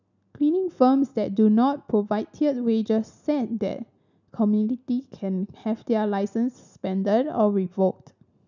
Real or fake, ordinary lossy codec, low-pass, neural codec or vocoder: real; none; 7.2 kHz; none